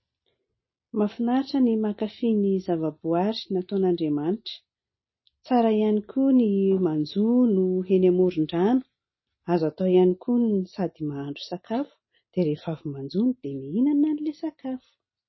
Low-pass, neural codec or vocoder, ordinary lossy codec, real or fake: 7.2 kHz; none; MP3, 24 kbps; real